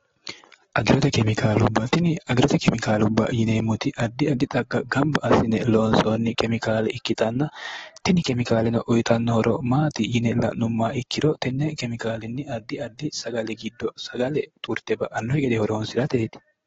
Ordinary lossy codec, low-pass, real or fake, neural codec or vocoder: AAC, 24 kbps; 7.2 kHz; real; none